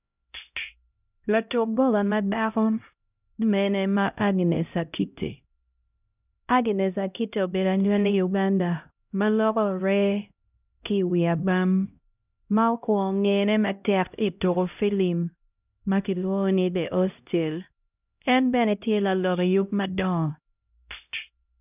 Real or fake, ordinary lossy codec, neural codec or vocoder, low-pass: fake; none; codec, 16 kHz, 0.5 kbps, X-Codec, HuBERT features, trained on LibriSpeech; 3.6 kHz